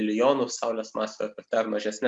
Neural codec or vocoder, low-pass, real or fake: none; 9.9 kHz; real